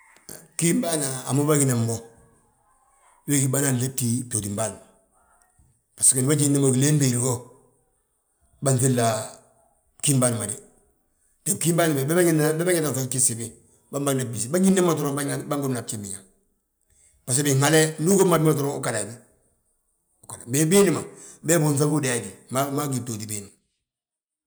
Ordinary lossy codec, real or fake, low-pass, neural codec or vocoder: none; real; none; none